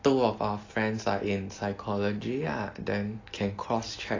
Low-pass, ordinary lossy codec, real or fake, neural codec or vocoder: 7.2 kHz; AAC, 32 kbps; real; none